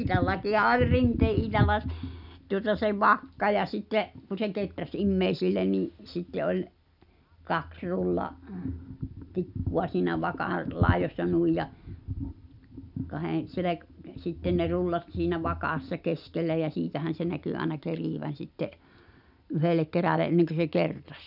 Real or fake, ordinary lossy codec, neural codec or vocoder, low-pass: real; none; none; 5.4 kHz